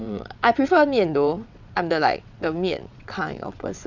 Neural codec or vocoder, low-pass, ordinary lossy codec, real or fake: vocoder, 22.05 kHz, 80 mel bands, WaveNeXt; 7.2 kHz; none; fake